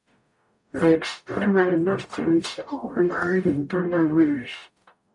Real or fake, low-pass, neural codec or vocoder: fake; 10.8 kHz; codec, 44.1 kHz, 0.9 kbps, DAC